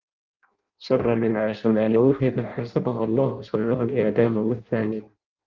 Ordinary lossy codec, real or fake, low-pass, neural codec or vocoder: Opus, 24 kbps; fake; 7.2 kHz; codec, 16 kHz in and 24 kHz out, 0.6 kbps, FireRedTTS-2 codec